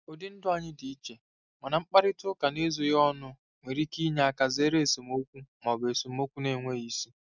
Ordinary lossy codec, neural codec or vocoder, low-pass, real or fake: none; none; 7.2 kHz; real